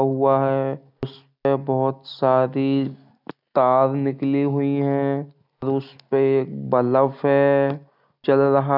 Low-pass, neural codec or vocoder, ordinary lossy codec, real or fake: 5.4 kHz; none; none; real